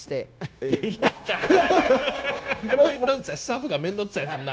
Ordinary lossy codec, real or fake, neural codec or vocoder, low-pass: none; fake; codec, 16 kHz, 0.9 kbps, LongCat-Audio-Codec; none